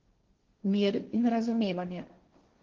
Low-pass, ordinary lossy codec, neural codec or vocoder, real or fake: 7.2 kHz; Opus, 16 kbps; codec, 16 kHz, 1.1 kbps, Voila-Tokenizer; fake